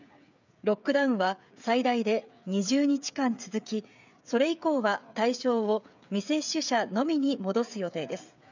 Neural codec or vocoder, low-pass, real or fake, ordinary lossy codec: codec, 16 kHz, 8 kbps, FreqCodec, smaller model; 7.2 kHz; fake; none